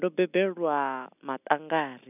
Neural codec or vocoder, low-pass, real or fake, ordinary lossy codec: none; 3.6 kHz; real; none